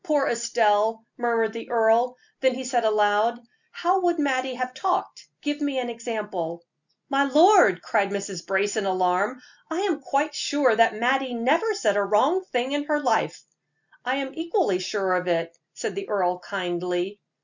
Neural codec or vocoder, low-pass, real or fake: none; 7.2 kHz; real